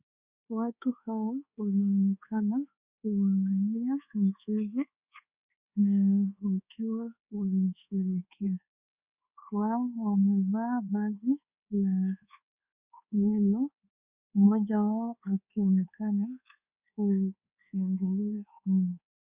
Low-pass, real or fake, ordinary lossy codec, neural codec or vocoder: 3.6 kHz; fake; Opus, 64 kbps; codec, 24 kHz, 1.2 kbps, DualCodec